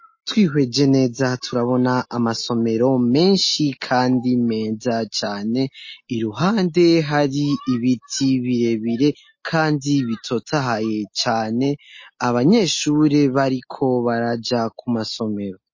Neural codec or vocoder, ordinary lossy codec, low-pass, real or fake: none; MP3, 32 kbps; 7.2 kHz; real